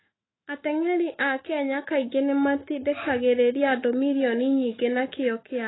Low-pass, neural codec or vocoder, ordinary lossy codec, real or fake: 7.2 kHz; autoencoder, 48 kHz, 128 numbers a frame, DAC-VAE, trained on Japanese speech; AAC, 16 kbps; fake